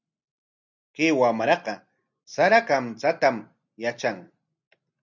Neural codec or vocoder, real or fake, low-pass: none; real; 7.2 kHz